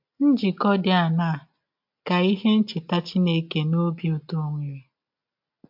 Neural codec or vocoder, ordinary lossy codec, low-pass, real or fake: none; MP3, 48 kbps; 5.4 kHz; real